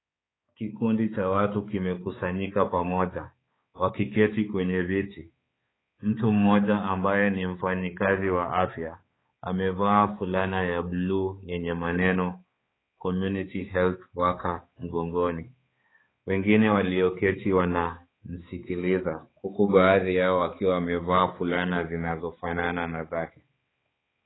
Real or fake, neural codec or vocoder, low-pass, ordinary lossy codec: fake; codec, 16 kHz, 4 kbps, X-Codec, HuBERT features, trained on balanced general audio; 7.2 kHz; AAC, 16 kbps